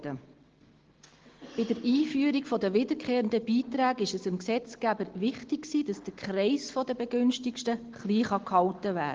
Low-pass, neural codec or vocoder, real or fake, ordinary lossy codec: 7.2 kHz; none; real; Opus, 16 kbps